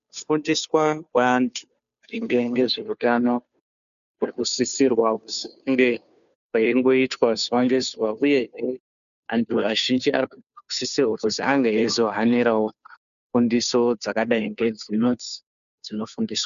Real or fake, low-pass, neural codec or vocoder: fake; 7.2 kHz; codec, 16 kHz, 2 kbps, FunCodec, trained on Chinese and English, 25 frames a second